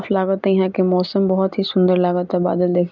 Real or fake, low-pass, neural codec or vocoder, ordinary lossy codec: real; 7.2 kHz; none; none